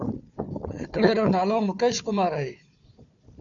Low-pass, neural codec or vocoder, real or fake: 7.2 kHz; codec, 16 kHz, 4 kbps, FunCodec, trained on Chinese and English, 50 frames a second; fake